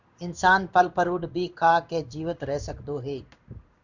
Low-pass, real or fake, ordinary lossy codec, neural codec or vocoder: 7.2 kHz; fake; Opus, 64 kbps; codec, 16 kHz in and 24 kHz out, 1 kbps, XY-Tokenizer